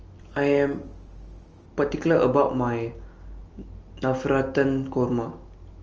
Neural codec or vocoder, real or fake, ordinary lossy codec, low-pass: none; real; Opus, 24 kbps; 7.2 kHz